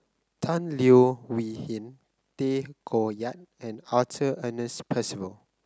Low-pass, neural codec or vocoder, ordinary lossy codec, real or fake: none; none; none; real